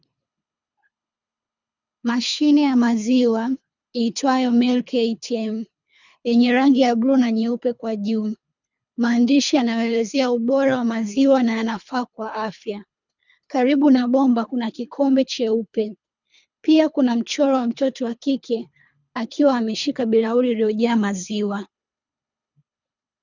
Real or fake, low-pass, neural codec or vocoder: fake; 7.2 kHz; codec, 24 kHz, 3 kbps, HILCodec